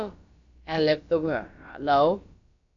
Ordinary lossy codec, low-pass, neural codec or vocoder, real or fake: AAC, 64 kbps; 7.2 kHz; codec, 16 kHz, about 1 kbps, DyCAST, with the encoder's durations; fake